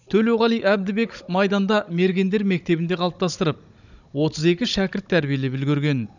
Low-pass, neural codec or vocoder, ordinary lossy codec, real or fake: 7.2 kHz; codec, 16 kHz, 16 kbps, FunCodec, trained on Chinese and English, 50 frames a second; none; fake